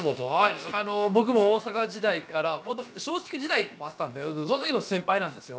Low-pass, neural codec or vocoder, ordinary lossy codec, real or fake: none; codec, 16 kHz, about 1 kbps, DyCAST, with the encoder's durations; none; fake